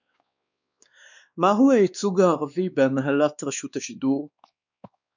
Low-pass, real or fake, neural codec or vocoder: 7.2 kHz; fake; codec, 16 kHz, 2 kbps, X-Codec, WavLM features, trained on Multilingual LibriSpeech